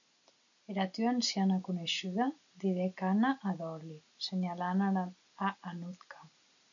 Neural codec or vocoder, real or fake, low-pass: none; real; 7.2 kHz